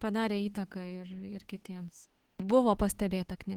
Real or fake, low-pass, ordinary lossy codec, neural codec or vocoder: fake; 19.8 kHz; Opus, 16 kbps; autoencoder, 48 kHz, 32 numbers a frame, DAC-VAE, trained on Japanese speech